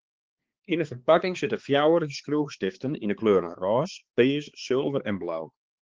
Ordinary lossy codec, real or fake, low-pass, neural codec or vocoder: Opus, 16 kbps; fake; 7.2 kHz; codec, 16 kHz, 4 kbps, X-Codec, HuBERT features, trained on balanced general audio